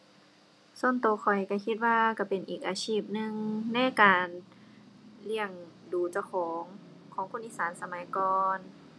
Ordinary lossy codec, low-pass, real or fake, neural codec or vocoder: none; none; real; none